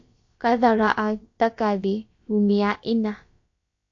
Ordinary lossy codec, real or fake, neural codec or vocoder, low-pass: AAC, 48 kbps; fake; codec, 16 kHz, about 1 kbps, DyCAST, with the encoder's durations; 7.2 kHz